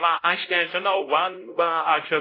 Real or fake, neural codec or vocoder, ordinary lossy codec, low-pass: fake; codec, 16 kHz, 0.5 kbps, X-Codec, WavLM features, trained on Multilingual LibriSpeech; AAC, 24 kbps; 5.4 kHz